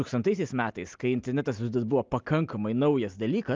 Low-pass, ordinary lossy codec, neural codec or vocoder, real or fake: 7.2 kHz; Opus, 32 kbps; none; real